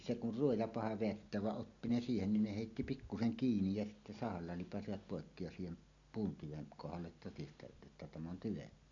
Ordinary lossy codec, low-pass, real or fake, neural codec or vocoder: none; 7.2 kHz; real; none